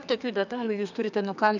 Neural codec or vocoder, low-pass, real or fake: codec, 32 kHz, 1.9 kbps, SNAC; 7.2 kHz; fake